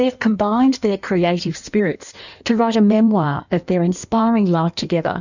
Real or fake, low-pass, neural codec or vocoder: fake; 7.2 kHz; codec, 16 kHz in and 24 kHz out, 1.1 kbps, FireRedTTS-2 codec